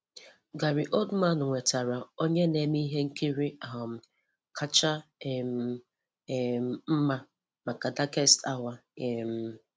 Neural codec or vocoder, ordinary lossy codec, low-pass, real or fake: none; none; none; real